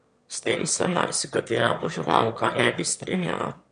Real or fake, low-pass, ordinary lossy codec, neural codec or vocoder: fake; 9.9 kHz; MP3, 64 kbps; autoencoder, 22.05 kHz, a latent of 192 numbers a frame, VITS, trained on one speaker